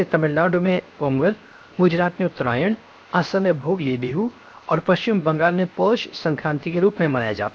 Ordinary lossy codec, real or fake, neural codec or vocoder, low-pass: none; fake; codec, 16 kHz, 0.7 kbps, FocalCodec; none